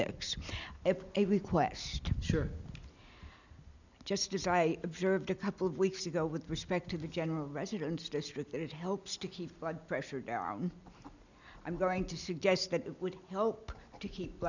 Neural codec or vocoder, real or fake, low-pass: none; real; 7.2 kHz